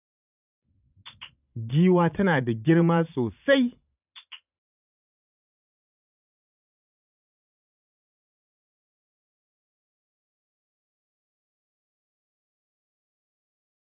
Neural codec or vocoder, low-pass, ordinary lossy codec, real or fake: vocoder, 22.05 kHz, 80 mel bands, Vocos; 3.6 kHz; none; fake